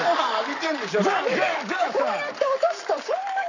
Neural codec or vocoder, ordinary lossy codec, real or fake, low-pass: codec, 44.1 kHz, 7.8 kbps, Pupu-Codec; none; fake; 7.2 kHz